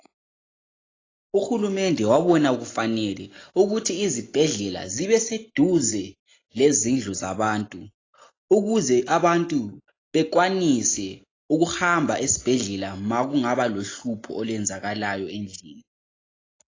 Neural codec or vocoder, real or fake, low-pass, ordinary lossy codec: none; real; 7.2 kHz; AAC, 32 kbps